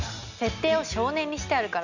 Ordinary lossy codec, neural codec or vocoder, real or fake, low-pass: none; none; real; 7.2 kHz